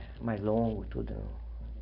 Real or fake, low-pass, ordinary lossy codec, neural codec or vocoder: real; 5.4 kHz; none; none